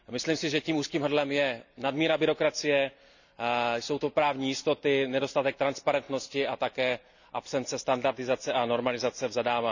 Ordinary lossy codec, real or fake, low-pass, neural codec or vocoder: none; real; 7.2 kHz; none